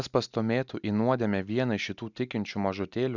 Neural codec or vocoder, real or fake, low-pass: none; real; 7.2 kHz